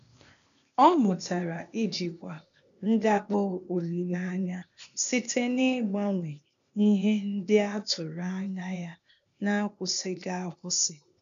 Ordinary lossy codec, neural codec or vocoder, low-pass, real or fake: none; codec, 16 kHz, 0.8 kbps, ZipCodec; 7.2 kHz; fake